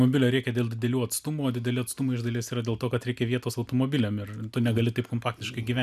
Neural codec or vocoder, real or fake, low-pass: none; real; 14.4 kHz